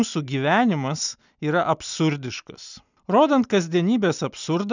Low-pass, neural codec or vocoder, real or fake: 7.2 kHz; none; real